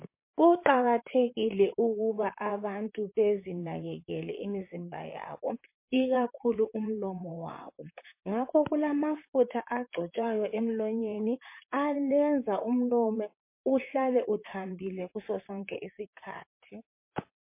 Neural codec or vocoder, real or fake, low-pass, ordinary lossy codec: vocoder, 44.1 kHz, 128 mel bands, Pupu-Vocoder; fake; 3.6 kHz; MP3, 24 kbps